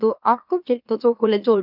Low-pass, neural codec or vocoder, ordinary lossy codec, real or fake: 5.4 kHz; autoencoder, 44.1 kHz, a latent of 192 numbers a frame, MeloTTS; none; fake